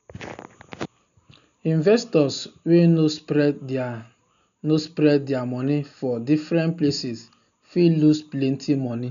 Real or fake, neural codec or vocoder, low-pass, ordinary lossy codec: real; none; 7.2 kHz; none